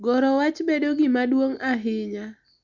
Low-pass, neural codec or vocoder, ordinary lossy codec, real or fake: 7.2 kHz; none; none; real